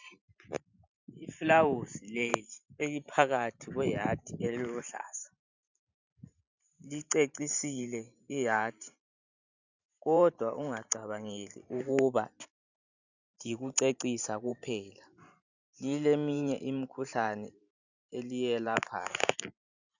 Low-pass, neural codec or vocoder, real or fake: 7.2 kHz; vocoder, 44.1 kHz, 128 mel bands every 512 samples, BigVGAN v2; fake